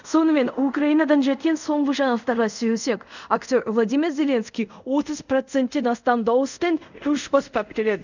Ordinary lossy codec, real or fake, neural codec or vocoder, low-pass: none; fake; codec, 24 kHz, 0.5 kbps, DualCodec; 7.2 kHz